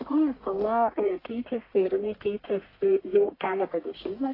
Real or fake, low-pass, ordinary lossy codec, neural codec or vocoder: fake; 5.4 kHz; AAC, 24 kbps; codec, 44.1 kHz, 1.7 kbps, Pupu-Codec